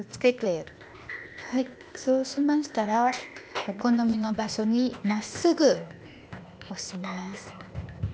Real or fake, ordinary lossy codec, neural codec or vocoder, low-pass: fake; none; codec, 16 kHz, 0.8 kbps, ZipCodec; none